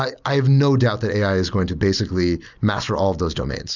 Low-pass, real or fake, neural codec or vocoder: 7.2 kHz; real; none